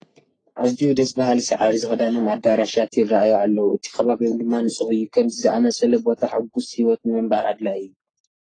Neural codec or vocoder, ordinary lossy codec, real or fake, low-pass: codec, 44.1 kHz, 3.4 kbps, Pupu-Codec; AAC, 32 kbps; fake; 9.9 kHz